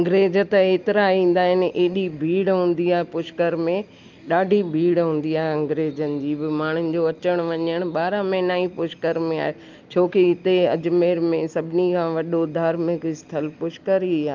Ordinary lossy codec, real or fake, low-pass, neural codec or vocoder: Opus, 24 kbps; real; 7.2 kHz; none